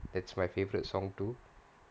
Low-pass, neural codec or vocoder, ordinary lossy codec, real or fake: none; none; none; real